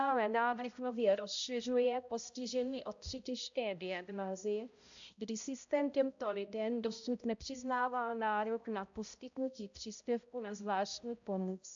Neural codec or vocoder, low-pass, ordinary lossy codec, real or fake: codec, 16 kHz, 0.5 kbps, X-Codec, HuBERT features, trained on balanced general audio; 7.2 kHz; AAC, 64 kbps; fake